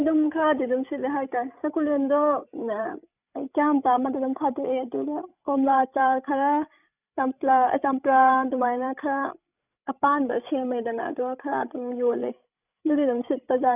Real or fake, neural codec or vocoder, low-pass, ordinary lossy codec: fake; codec, 16 kHz, 16 kbps, FreqCodec, larger model; 3.6 kHz; none